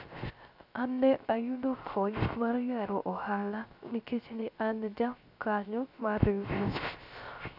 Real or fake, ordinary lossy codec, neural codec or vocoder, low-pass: fake; none; codec, 16 kHz, 0.3 kbps, FocalCodec; 5.4 kHz